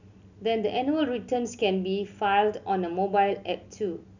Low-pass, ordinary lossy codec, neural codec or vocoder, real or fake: 7.2 kHz; none; none; real